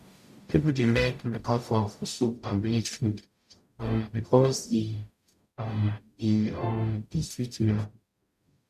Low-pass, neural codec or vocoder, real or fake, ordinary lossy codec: 14.4 kHz; codec, 44.1 kHz, 0.9 kbps, DAC; fake; MP3, 96 kbps